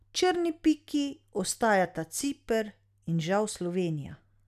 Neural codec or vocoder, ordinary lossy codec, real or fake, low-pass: none; none; real; 14.4 kHz